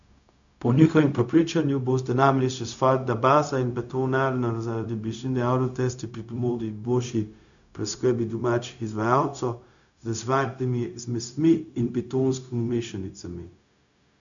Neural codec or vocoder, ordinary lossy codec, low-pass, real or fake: codec, 16 kHz, 0.4 kbps, LongCat-Audio-Codec; none; 7.2 kHz; fake